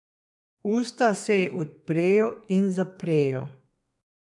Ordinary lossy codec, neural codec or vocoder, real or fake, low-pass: none; codec, 32 kHz, 1.9 kbps, SNAC; fake; 10.8 kHz